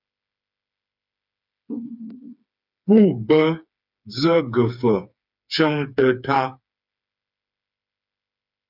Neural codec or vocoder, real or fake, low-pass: codec, 16 kHz, 4 kbps, FreqCodec, smaller model; fake; 5.4 kHz